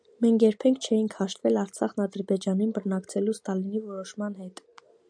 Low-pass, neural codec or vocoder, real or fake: 9.9 kHz; none; real